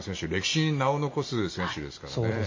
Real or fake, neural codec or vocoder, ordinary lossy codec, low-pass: real; none; MP3, 32 kbps; 7.2 kHz